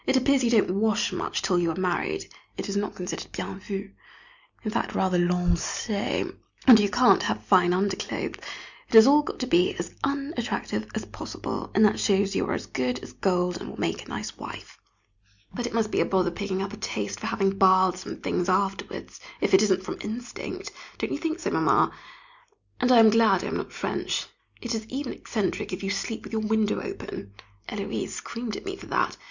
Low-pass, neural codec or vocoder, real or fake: 7.2 kHz; none; real